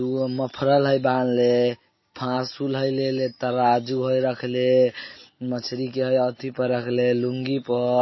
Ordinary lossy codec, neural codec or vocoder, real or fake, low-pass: MP3, 24 kbps; none; real; 7.2 kHz